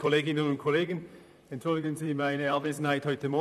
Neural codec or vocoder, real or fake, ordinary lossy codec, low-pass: vocoder, 44.1 kHz, 128 mel bands, Pupu-Vocoder; fake; none; 14.4 kHz